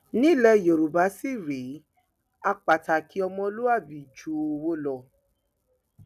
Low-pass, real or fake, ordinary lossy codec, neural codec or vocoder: 14.4 kHz; real; none; none